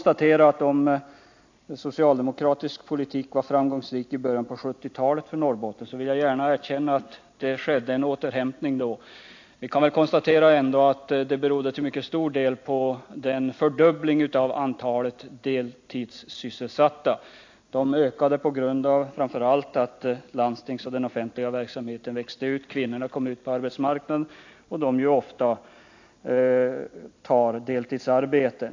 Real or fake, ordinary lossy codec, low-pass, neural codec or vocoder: real; AAC, 48 kbps; 7.2 kHz; none